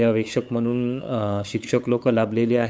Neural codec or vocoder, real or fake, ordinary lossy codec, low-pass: codec, 16 kHz, 8 kbps, FunCodec, trained on LibriTTS, 25 frames a second; fake; none; none